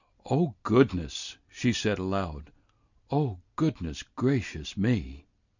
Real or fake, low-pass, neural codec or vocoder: real; 7.2 kHz; none